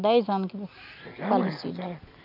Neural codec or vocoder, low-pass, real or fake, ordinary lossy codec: none; 5.4 kHz; real; none